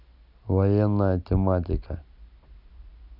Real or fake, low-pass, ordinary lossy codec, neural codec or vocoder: real; 5.4 kHz; none; none